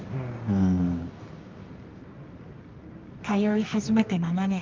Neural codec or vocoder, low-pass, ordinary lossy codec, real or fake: codec, 24 kHz, 0.9 kbps, WavTokenizer, medium music audio release; 7.2 kHz; Opus, 24 kbps; fake